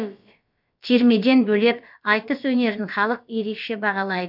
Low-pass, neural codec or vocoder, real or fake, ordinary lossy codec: 5.4 kHz; codec, 16 kHz, about 1 kbps, DyCAST, with the encoder's durations; fake; none